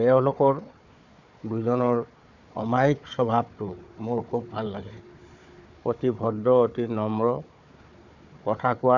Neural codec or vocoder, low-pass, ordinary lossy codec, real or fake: codec, 16 kHz, 4 kbps, FunCodec, trained on Chinese and English, 50 frames a second; 7.2 kHz; none; fake